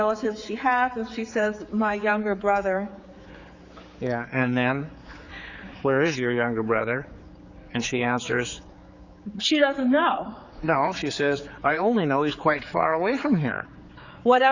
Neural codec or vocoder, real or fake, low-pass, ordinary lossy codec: codec, 16 kHz, 4 kbps, X-Codec, HuBERT features, trained on general audio; fake; 7.2 kHz; Opus, 64 kbps